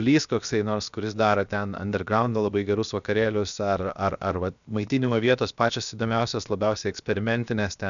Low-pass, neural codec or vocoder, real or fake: 7.2 kHz; codec, 16 kHz, 0.7 kbps, FocalCodec; fake